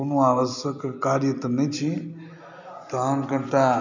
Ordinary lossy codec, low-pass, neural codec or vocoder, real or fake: none; 7.2 kHz; none; real